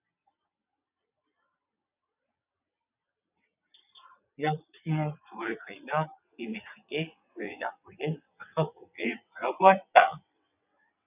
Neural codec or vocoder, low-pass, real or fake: vocoder, 22.05 kHz, 80 mel bands, WaveNeXt; 3.6 kHz; fake